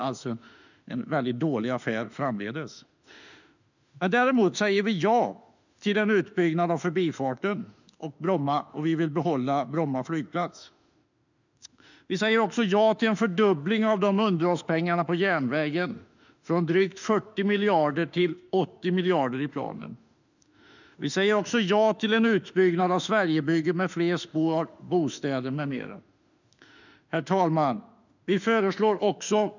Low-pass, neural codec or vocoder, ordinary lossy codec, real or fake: 7.2 kHz; autoencoder, 48 kHz, 32 numbers a frame, DAC-VAE, trained on Japanese speech; none; fake